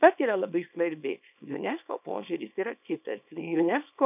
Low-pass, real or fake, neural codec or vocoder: 3.6 kHz; fake; codec, 24 kHz, 0.9 kbps, WavTokenizer, small release